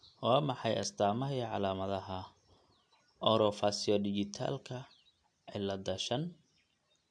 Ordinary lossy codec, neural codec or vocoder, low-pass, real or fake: MP3, 64 kbps; none; 9.9 kHz; real